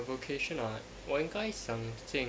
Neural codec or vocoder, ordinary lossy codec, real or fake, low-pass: none; none; real; none